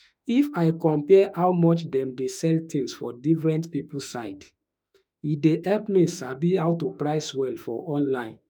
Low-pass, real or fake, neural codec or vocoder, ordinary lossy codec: none; fake; autoencoder, 48 kHz, 32 numbers a frame, DAC-VAE, trained on Japanese speech; none